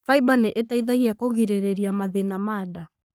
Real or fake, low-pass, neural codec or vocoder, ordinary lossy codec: fake; none; codec, 44.1 kHz, 3.4 kbps, Pupu-Codec; none